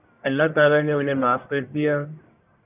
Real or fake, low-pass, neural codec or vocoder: fake; 3.6 kHz; codec, 44.1 kHz, 1.7 kbps, Pupu-Codec